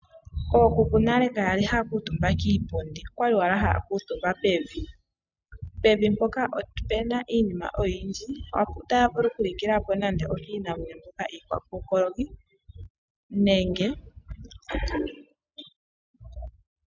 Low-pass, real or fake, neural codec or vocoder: 7.2 kHz; real; none